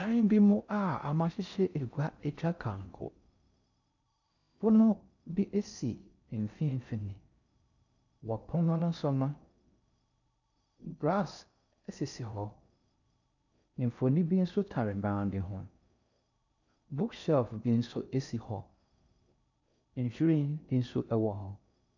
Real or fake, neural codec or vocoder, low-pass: fake; codec, 16 kHz in and 24 kHz out, 0.6 kbps, FocalCodec, streaming, 4096 codes; 7.2 kHz